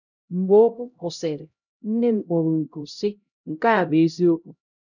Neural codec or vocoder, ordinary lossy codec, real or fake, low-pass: codec, 16 kHz, 0.5 kbps, X-Codec, HuBERT features, trained on LibriSpeech; none; fake; 7.2 kHz